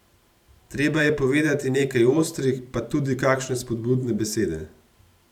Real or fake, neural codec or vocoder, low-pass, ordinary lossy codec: fake; vocoder, 44.1 kHz, 128 mel bands every 512 samples, BigVGAN v2; 19.8 kHz; none